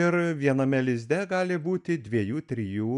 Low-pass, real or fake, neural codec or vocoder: 10.8 kHz; real; none